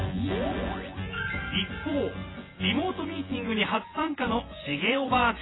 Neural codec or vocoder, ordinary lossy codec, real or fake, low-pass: vocoder, 24 kHz, 100 mel bands, Vocos; AAC, 16 kbps; fake; 7.2 kHz